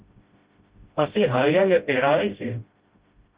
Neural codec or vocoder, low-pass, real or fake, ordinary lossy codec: codec, 16 kHz, 0.5 kbps, FreqCodec, smaller model; 3.6 kHz; fake; Opus, 32 kbps